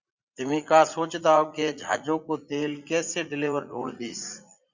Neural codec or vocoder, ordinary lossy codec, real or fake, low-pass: vocoder, 44.1 kHz, 80 mel bands, Vocos; Opus, 64 kbps; fake; 7.2 kHz